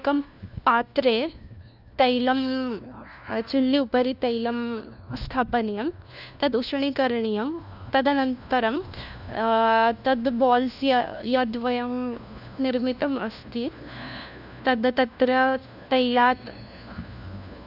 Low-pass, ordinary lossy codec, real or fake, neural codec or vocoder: 5.4 kHz; none; fake; codec, 16 kHz, 1 kbps, FunCodec, trained on LibriTTS, 50 frames a second